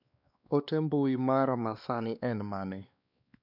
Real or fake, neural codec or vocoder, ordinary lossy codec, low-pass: fake; codec, 16 kHz, 2 kbps, X-Codec, WavLM features, trained on Multilingual LibriSpeech; none; 5.4 kHz